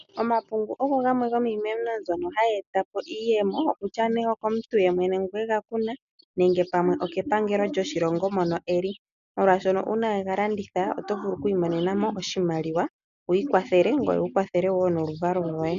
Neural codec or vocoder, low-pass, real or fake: none; 7.2 kHz; real